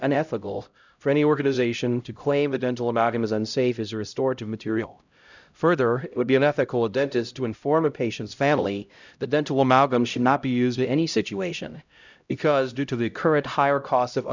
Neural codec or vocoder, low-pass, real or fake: codec, 16 kHz, 0.5 kbps, X-Codec, HuBERT features, trained on LibriSpeech; 7.2 kHz; fake